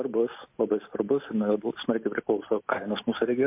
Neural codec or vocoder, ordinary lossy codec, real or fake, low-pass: none; MP3, 32 kbps; real; 3.6 kHz